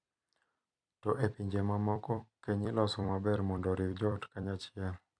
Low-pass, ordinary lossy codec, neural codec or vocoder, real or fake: 9.9 kHz; none; vocoder, 44.1 kHz, 128 mel bands every 256 samples, BigVGAN v2; fake